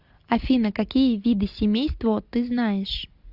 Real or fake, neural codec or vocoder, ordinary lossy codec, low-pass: real; none; Opus, 64 kbps; 5.4 kHz